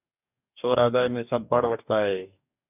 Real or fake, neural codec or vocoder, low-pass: fake; codec, 44.1 kHz, 2.6 kbps, DAC; 3.6 kHz